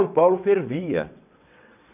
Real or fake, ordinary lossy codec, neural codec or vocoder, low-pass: fake; none; vocoder, 22.05 kHz, 80 mel bands, WaveNeXt; 3.6 kHz